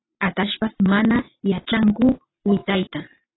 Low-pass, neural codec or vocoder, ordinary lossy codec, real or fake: 7.2 kHz; none; AAC, 16 kbps; real